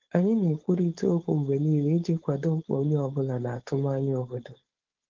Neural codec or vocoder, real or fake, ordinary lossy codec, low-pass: codec, 16 kHz, 4.8 kbps, FACodec; fake; Opus, 16 kbps; 7.2 kHz